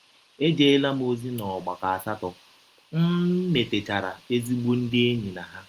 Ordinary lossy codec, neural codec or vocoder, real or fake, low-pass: Opus, 24 kbps; none; real; 14.4 kHz